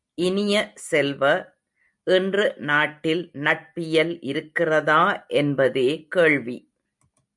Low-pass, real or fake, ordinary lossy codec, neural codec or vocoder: 10.8 kHz; real; MP3, 96 kbps; none